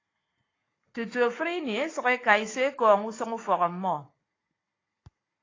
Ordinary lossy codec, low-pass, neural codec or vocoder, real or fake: AAC, 32 kbps; 7.2 kHz; codec, 44.1 kHz, 7.8 kbps, Pupu-Codec; fake